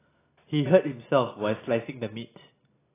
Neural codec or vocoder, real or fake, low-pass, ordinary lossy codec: none; real; 3.6 kHz; AAC, 16 kbps